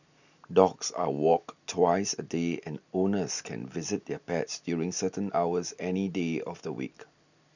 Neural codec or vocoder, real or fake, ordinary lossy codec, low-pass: none; real; none; 7.2 kHz